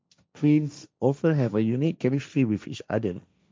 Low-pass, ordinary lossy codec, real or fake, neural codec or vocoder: none; none; fake; codec, 16 kHz, 1.1 kbps, Voila-Tokenizer